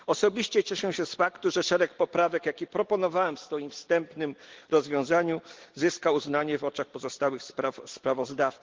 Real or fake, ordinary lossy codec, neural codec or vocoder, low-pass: real; Opus, 16 kbps; none; 7.2 kHz